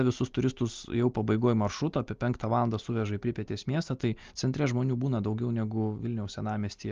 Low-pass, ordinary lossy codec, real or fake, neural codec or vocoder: 7.2 kHz; Opus, 32 kbps; real; none